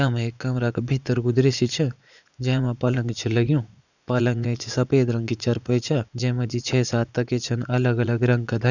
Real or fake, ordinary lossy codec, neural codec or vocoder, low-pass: fake; none; vocoder, 44.1 kHz, 80 mel bands, Vocos; 7.2 kHz